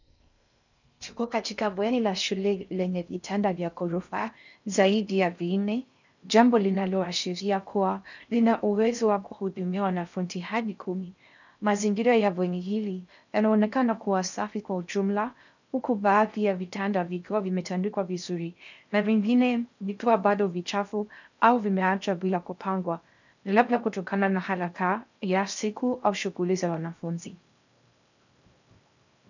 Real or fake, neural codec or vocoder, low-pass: fake; codec, 16 kHz in and 24 kHz out, 0.6 kbps, FocalCodec, streaming, 4096 codes; 7.2 kHz